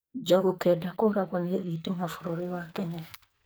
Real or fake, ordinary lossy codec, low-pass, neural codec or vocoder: fake; none; none; codec, 44.1 kHz, 2.6 kbps, SNAC